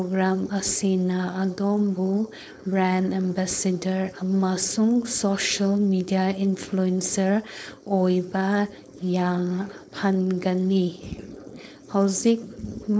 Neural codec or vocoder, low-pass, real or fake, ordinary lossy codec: codec, 16 kHz, 4.8 kbps, FACodec; none; fake; none